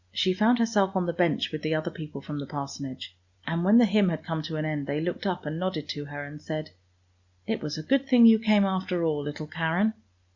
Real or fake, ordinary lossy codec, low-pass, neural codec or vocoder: real; Opus, 64 kbps; 7.2 kHz; none